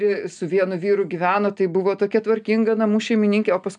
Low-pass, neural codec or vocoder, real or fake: 9.9 kHz; none; real